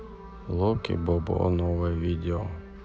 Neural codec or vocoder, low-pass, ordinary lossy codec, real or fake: none; none; none; real